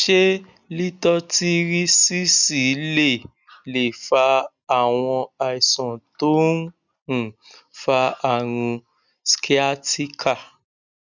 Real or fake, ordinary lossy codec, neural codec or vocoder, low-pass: real; none; none; 7.2 kHz